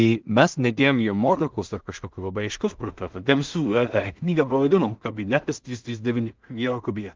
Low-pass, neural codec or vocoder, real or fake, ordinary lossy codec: 7.2 kHz; codec, 16 kHz in and 24 kHz out, 0.4 kbps, LongCat-Audio-Codec, two codebook decoder; fake; Opus, 24 kbps